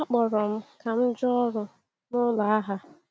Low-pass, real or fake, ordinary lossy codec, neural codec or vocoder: none; real; none; none